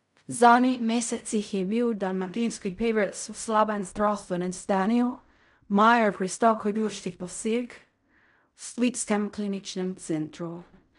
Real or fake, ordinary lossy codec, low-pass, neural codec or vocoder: fake; none; 10.8 kHz; codec, 16 kHz in and 24 kHz out, 0.4 kbps, LongCat-Audio-Codec, fine tuned four codebook decoder